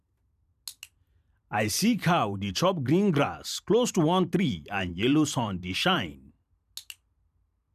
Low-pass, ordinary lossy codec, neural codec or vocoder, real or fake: 14.4 kHz; none; vocoder, 44.1 kHz, 128 mel bands every 512 samples, BigVGAN v2; fake